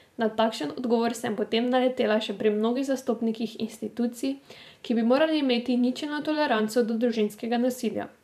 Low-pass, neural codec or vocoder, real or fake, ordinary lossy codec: 14.4 kHz; vocoder, 44.1 kHz, 128 mel bands every 512 samples, BigVGAN v2; fake; none